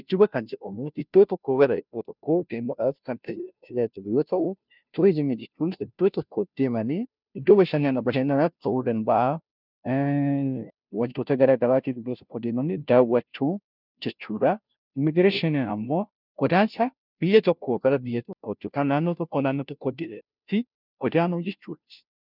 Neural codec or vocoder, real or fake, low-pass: codec, 16 kHz, 0.5 kbps, FunCodec, trained on Chinese and English, 25 frames a second; fake; 5.4 kHz